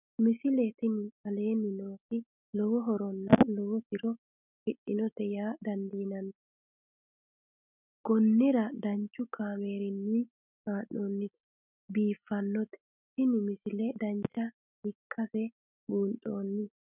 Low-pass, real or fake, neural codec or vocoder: 3.6 kHz; real; none